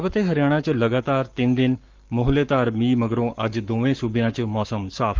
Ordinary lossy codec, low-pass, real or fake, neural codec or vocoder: Opus, 32 kbps; 7.2 kHz; fake; codec, 44.1 kHz, 7.8 kbps, Pupu-Codec